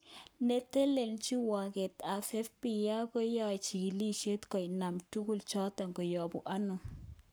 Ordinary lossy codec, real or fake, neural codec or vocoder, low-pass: none; fake; codec, 44.1 kHz, 7.8 kbps, Pupu-Codec; none